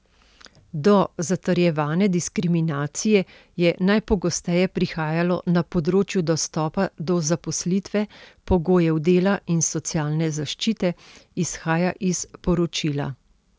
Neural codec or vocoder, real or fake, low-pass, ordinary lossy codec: none; real; none; none